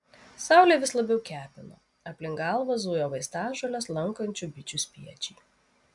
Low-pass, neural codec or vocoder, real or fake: 10.8 kHz; none; real